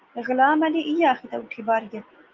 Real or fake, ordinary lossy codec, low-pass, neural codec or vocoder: real; Opus, 32 kbps; 7.2 kHz; none